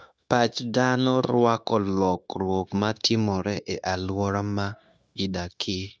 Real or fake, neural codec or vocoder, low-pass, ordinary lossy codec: fake; codec, 16 kHz, 0.9 kbps, LongCat-Audio-Codec; none; none